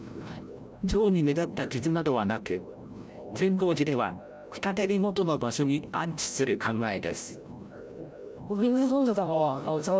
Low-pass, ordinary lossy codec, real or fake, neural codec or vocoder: none; none; fake; codec, 16 kHz, 0.5 kbps, FreqCodec, larger model